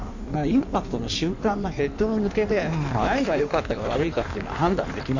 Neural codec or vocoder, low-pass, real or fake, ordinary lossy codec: codec, 16 kHz in and 24 kHz out, 1.1 kbps, FireRedTTS-2 codec; 7.2 kHz; fake; none